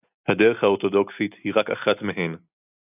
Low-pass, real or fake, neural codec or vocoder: 3.6 kHz; real; none